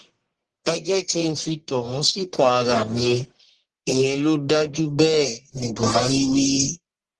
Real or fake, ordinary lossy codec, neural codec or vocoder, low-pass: fake; Opus, 16 kbps; codec, 44.1 kHz, 1.7 kbps, Pupu-Codec; 10.8 kHz